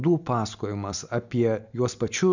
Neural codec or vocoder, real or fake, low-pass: none; real; 7.2 kHz